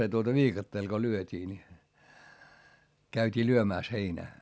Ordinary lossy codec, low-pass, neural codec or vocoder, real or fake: none; none; none; real